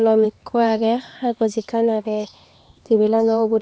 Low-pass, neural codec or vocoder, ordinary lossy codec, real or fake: none; codec, 16 kHz, 2 kbps, X-Codec, HuBERT features, trained on LibriSpeech; none; fake